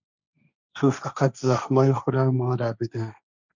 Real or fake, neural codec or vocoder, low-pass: fake; codec, 16 kHz, 1.1 kbps, Voila-Tokenizer; 7.2 kHz